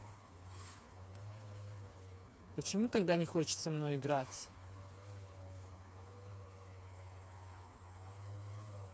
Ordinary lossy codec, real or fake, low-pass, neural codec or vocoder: none; fake; none; codec, 16 kHz, 4 kbps, FreqCodec, smaller model